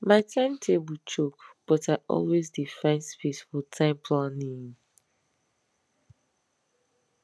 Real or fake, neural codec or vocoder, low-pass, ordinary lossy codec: real; none; none; none